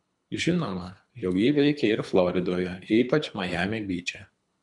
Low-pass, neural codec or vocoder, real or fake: 10.8 kHz; codec, 24 kHz, 3 kbps, HILCodec; fake